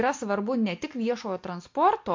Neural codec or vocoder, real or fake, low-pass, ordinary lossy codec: none; real; 7.2 kHz; MP3, 48 kbps